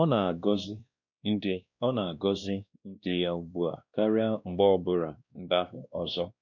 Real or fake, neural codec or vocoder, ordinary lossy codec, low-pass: fake; codec, 16 kHz, 1 kbps, X-Codec, WavLM features, trained on Multilingual LibriSpeech; none; 7.2 kHz